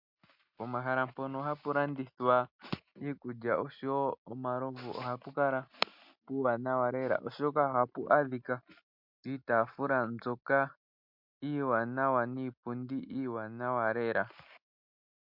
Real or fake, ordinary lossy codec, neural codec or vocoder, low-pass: real; MP3, 48 kbps; none; 5.4 kHz